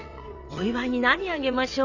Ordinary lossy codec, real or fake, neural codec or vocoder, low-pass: none; fake; vocoder, 22.05 kHz, 80 mel bands, WaveNeXt; 7.2 kHz